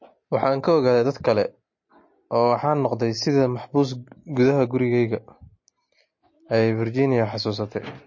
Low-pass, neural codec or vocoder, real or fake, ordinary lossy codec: 7.2 kHz; none; real; MP3, 32 kbps